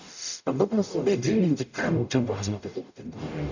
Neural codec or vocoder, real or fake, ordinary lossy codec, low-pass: codec, 44.1 kHz, 0.9 kbps, DAC; fake; none; 7.2 kHz